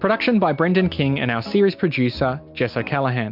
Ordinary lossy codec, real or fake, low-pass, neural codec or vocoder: MP3, 48 kbps; real; 5.4 kHz; none